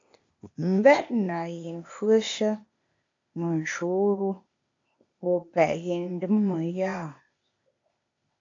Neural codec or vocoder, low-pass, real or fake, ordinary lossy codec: codec, 16 kHz, 0.8 kbps, ZipCodec; 7.2 kHz; fake; AAC, 48 kbps